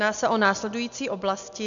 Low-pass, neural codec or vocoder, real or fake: 7.2 kHz; none; real